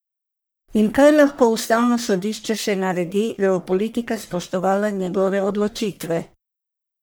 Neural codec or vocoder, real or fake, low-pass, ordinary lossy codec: codec, 44.1 kHz, 1.7 kbps, Pupu-Codec; fake; none; none